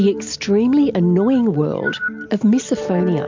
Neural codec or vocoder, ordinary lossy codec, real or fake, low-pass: none; MP3, 64 kbps; real; 7.2 kHz